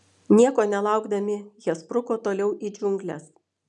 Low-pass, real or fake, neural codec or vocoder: 10.8 kHz; real; none